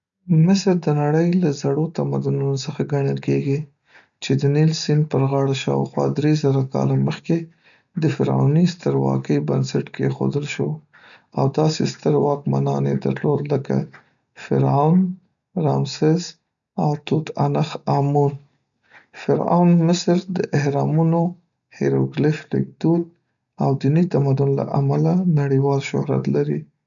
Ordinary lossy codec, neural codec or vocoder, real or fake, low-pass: none; none; real; 7.2 kHz